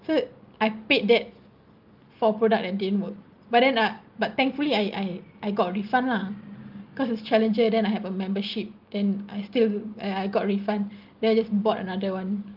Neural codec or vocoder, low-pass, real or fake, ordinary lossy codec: none; 5.4 kHz; real; Opus, 32 kbps